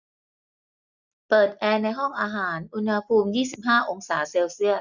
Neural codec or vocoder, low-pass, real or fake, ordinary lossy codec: none; 7.2 kHz; real; none